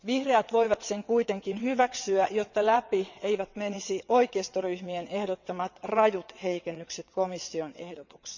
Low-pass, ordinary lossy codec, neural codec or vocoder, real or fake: 7.2 kHz; none; vocoder, 22.05 kHz, 80 mel bands, WaveNeXt; fake